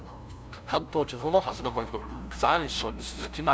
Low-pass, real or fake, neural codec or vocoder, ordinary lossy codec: none; fake; codec, 16 kHz, 0.5 kbps, FunCodec, trained on LibriTTS, 25 frames a second; none